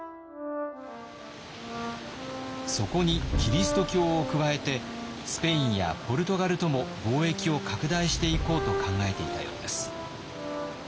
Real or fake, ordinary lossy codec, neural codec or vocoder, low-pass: real; none; none; none